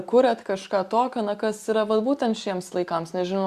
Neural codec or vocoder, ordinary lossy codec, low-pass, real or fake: none; Opus, 64 kbps; 14.4 kHz; real